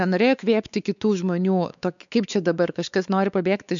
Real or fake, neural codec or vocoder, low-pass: fake; codec, 16 kHz, 4 kbps, X-Codec, WavLM features, trained on Multilingual LibriSpeech; 7.2 kHz